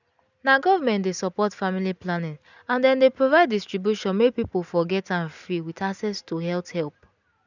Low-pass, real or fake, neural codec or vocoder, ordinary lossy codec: 7.2 kHz; real; none; none